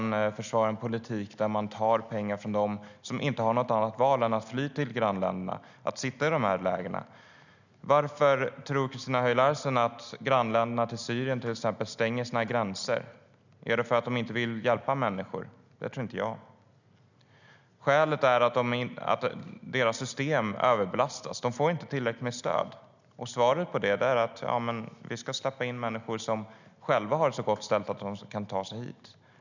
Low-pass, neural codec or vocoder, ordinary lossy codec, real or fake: 7.2 kHz; none; none; real